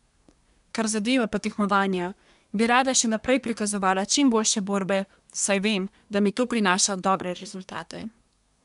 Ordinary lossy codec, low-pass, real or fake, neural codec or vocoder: none; 10.8 kHz; fake; codec, 24 kHz, 1 kbps, SNAC